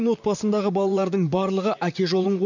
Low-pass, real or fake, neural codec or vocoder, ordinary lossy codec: 7.2 kHz; fake; vocoder, 44.1 kHz, 128 mel bands, Pupu-Vocoder; none